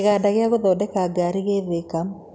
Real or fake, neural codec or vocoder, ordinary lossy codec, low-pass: real; none; none; none